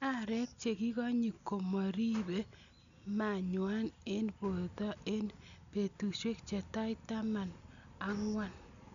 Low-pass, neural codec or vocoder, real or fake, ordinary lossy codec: 7.2 kHz; none; real; none